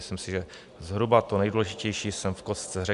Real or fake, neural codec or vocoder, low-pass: real; none; 10.8 kHz